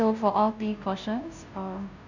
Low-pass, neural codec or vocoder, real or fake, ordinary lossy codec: 7.2 kHz; codec, 16 kHz, 0.5 kbps, FunCodec, trained on Chinese and English, 25 frames a second; fake; none